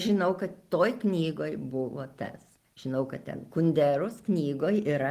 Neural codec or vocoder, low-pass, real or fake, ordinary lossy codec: none; 14.4 kHz; real; Opus, 24 kbps